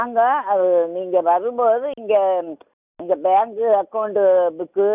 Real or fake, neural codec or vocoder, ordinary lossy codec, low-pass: real; none; none; 3.6 kHz